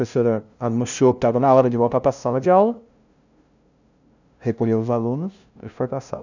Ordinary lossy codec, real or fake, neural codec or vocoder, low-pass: none; fake; codec, 16 kHz, 0.5 kbps, FunCodec, trained on LibriTTS, 25 frames a second; 7.2 kHz